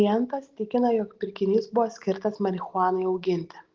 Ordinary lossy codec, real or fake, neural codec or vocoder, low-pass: Opus, 16 kbps; real; none; 7.2 kHz